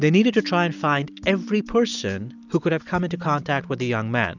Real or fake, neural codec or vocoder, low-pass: real; none; 7.2 kHz